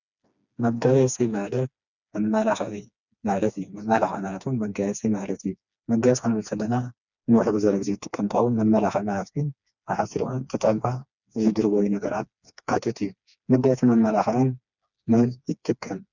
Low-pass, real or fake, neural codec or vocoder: 7.2 kHz; fake; codec, 16 kHz, 2 kbps, FreqCodec, smaller model